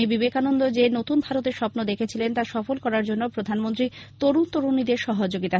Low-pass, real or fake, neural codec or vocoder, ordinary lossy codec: none; real; none; none